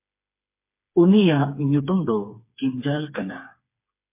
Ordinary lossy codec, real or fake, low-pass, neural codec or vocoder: MP3, 24 kbps; fake; 3.6 kHz; codec, 16 kHz, 4 kbps, FreqCodec, smaller model